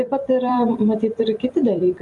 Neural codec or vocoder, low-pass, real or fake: vocoder, 44.1 kHz, 128 mel bands every 256 samples, BigVGAN v2; 10.8 kHz; fake